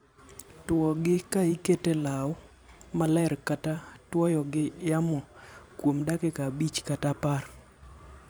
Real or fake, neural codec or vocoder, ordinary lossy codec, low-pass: fake; vocoder, 44.1 kHz, 128 mel bands every 512 samples, BigVGAN v2; none; none